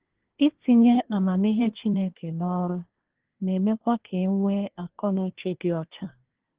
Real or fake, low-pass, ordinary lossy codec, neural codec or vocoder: fake; 3.6 kHz; Opus, 16 kbps; codec, 24 kHz, 1 kbps, SNAC